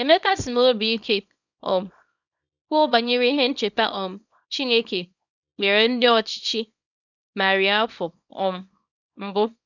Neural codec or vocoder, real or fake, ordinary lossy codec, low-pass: codec, 24 kHz, 0.9 kbps, WavTokenizer, small release; fake; none; 7.2 kHz